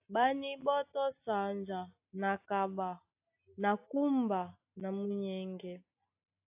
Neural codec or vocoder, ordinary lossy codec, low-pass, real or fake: none; AAC, 32 kbps; 3.6 kHz; real